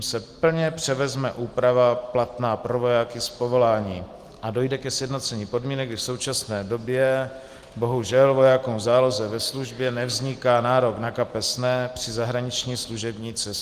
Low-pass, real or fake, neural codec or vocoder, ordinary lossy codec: 14.4 kHz; real; none; Opus, 24 kbps